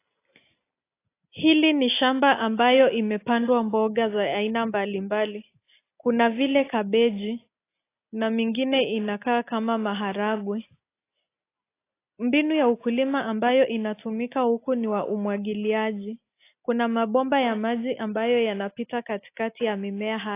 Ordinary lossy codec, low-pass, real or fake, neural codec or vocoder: AAC, 24 kbps; 3.6 kHz; real; none